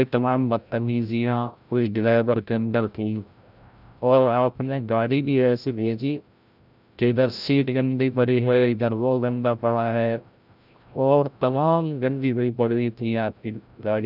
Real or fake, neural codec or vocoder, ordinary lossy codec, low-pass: fake; codec, 16 kHz, 0.5 kbps, FreqCodec, larger model; none; 5.4 kHz